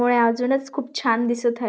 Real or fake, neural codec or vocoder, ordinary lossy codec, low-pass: real; none; none; none